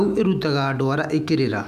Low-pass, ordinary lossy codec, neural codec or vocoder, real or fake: 14.4 kHz; none; autoencoder, 48 kHz, 128 numbers a frame, DAC-VAE, trained on Japanese speech; fake